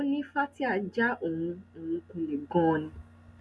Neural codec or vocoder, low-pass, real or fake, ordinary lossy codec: none; none; real; none